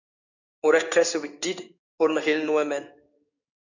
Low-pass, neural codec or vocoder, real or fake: 7.2 kHz; codec, 16 kHz in and 24 kHz out, 1 kbps, XY-Tokenizer; fake